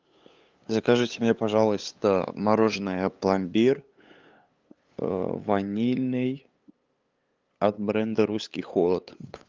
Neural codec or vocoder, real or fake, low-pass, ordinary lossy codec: codec, 16 kHz, 8 kbps, FunCodec, trained on LibriTTS, 25 frames a second; fake; 7.2 kHz; Opus, 24 kbps